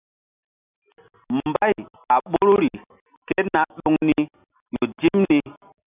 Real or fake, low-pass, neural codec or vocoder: real; 3.6 kHz; none